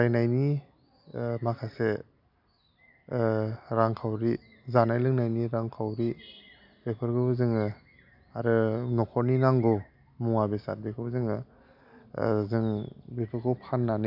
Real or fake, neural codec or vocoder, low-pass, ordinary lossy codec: real; none; 5.4 kHz; none